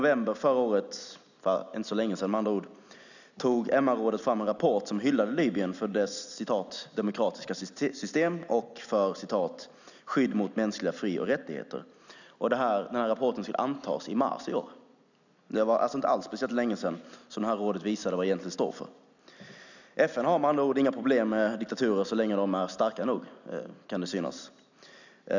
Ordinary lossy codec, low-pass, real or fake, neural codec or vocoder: none; 7.2 kHz; real; none